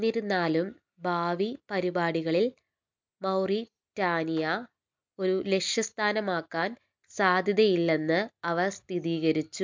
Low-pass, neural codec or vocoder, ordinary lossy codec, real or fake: 7.2 kHz; none; MP3, 64 kbps; real